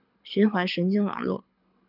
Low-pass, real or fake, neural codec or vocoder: 5.4 kHz; fake; codec, 24 kHz, 6 kbps, HILCodec